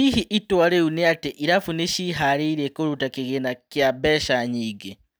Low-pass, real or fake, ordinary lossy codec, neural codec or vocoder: none; real; none; none